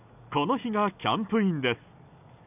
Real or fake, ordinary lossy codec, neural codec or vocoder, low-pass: real; none; none; 3.6 kHz